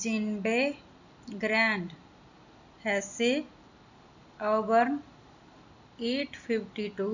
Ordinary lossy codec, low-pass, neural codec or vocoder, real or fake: none; 7.2 kHz; none; real